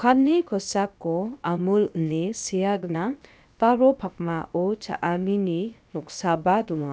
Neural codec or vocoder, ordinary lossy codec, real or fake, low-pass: codec, 16 kHz, about 1 kbps, DyCAST, with the encoder's durations; none; fake; none